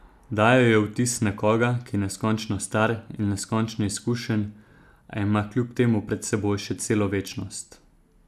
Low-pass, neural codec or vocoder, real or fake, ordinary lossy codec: 14.4 kHz; none; real; none